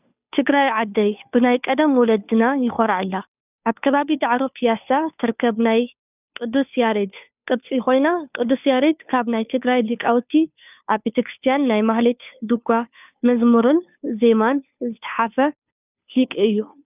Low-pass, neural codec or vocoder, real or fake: 3.6 kHz; codec, 16 kHz, 2 kbps, FunCodec, trained on Chinese and English, 25 frames a second; fake